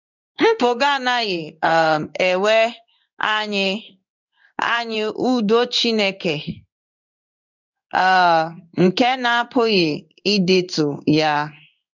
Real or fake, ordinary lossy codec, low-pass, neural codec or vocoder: fake; none; 7.2 kHz; codec, 16 kHz in and 24 kHz out, 1 kbps, XY-Tokenizer